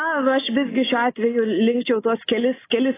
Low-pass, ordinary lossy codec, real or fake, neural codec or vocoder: 3.6 kHz; AAC, 16 kbps; real; none